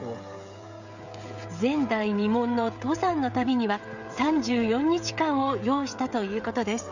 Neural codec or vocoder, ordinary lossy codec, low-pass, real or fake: codec, 16 kHz, 16 kbps, FreqCodec, smaller model; none; 7.2 kHz; fake